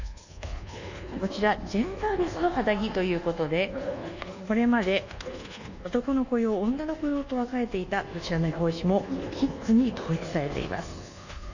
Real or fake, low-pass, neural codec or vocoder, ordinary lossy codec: fake; 7.2 kHz; codec, 24 kHz, 1.2 kbps, DualCodec; none